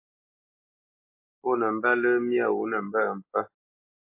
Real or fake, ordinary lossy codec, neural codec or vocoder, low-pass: real; MP3, 24 kbps; none; 3.6 kHz